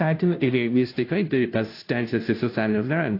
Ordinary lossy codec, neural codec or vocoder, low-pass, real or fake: AAC, 32 kbps; codec, 16 kHz, 0.5 kbps, FunCodec, trained on Chinese and English, 25 frames a second; 5.4 kHz; fake